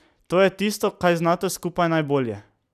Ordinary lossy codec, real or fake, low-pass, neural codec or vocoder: none; real; 14.4 kHz; none